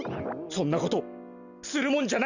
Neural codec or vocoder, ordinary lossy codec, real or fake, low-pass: none; none; real; 7.2 kHz